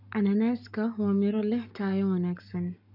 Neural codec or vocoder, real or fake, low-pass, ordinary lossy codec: codec, 44.1 kHz, 7.8 kbps, Pupu-Codec; fake; 5.4 kHz; none